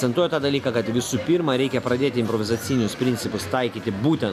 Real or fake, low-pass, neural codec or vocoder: real; 14.4 kHz; none